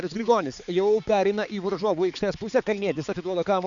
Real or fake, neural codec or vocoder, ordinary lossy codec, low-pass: fake; codec, 16 kHz, 4 kbps, X-Codec, HuBERT features, trained on balanced general audio; MP3, 96 kbps; 7.2 kHz